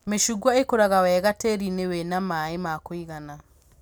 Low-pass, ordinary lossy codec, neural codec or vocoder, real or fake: none; none; none; real